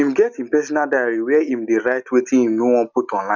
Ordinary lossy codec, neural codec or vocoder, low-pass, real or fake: none; none; 7.2 kHz; real